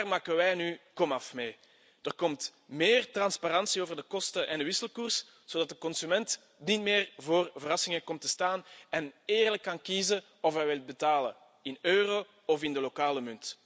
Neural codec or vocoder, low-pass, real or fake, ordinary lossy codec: none; none; real; none